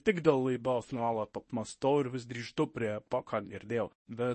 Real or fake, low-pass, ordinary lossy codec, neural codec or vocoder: fake; 10.8 kHz; MP3, 32 kbps; codec, 24 kHz, 0.9 kbps, WavTokenizer, medium speech release version 1